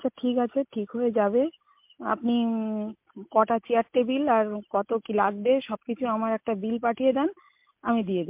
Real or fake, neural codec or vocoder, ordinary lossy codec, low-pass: real; none; MP3, 32 kbps; 3.6 kHz